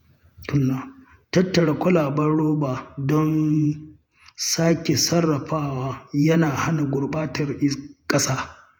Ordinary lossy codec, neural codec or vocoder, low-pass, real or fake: none; vocoder, 48 kHz, 128 mel bands, Vocos; none; fake